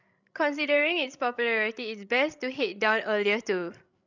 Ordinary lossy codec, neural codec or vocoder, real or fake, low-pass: none; codec, 16 kHz, 16 kbps, FreqCodec, larger model; fake; 7.2 kHz